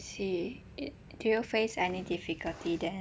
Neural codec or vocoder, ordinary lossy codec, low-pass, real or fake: none; none; none; real